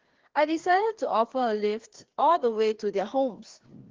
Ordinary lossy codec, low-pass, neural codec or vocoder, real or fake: Opus, 16 kbps; 7.2 kHz; codec, 16 kHz, 2 kbps, FreqCodec, larger model; fake